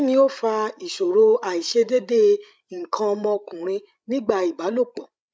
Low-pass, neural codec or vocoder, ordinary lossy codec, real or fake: none; codec, 16 kHz, 16 kbps, FreqCodec, larger model; none; fake